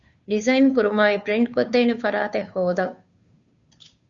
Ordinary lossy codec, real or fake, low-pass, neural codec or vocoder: Opus, 64 kbps; fake; 7.2 kHz; codec, 16 kHz, 2 kbps, FunCodec, trained on Chinese and English, 25 frames a second